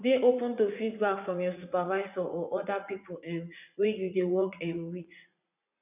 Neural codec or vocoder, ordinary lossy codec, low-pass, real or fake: vocoder, 44.1 kHz, 80 mel bands, Vocos; none; 3.6 kHz; fake